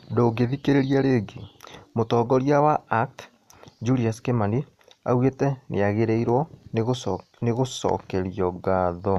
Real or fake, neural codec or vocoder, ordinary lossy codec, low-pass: real; none; none; 14.4 kHz